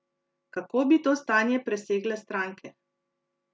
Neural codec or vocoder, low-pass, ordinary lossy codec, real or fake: none; none; none; real